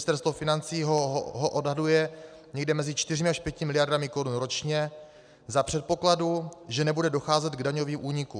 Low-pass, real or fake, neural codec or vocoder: 9.9 kHz; real; none